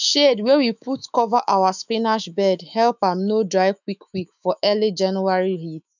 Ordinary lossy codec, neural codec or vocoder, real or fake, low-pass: none; autoencoder, 48 kHz, 128 numbers a frame, DAC-VAE, trained on Japanese speech; fake; 7.2 kHz